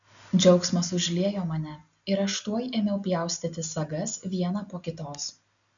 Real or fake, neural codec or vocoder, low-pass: real; none; 7.2 kHz